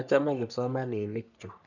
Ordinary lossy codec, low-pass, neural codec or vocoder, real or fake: none; 7.2 kHz; codec, 44.1 kHz, 3.4 kbps, Pupu-Codec; fake